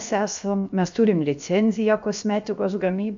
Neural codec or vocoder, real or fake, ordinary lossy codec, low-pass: codec, 16 kHz, about 1 kbps, DyCAST, with the encoder's durations; fake; MP3, 64 kbps; 7.2 kHz